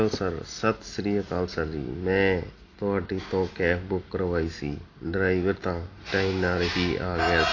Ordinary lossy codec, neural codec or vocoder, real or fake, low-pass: AAC, 32 kbps; none; real; 7.2 kHz